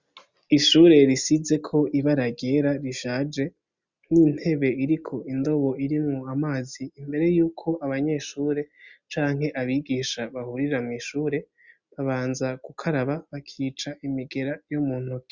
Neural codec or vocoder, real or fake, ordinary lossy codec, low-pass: none; real; Opus, 64 kbps; 7.2 kHz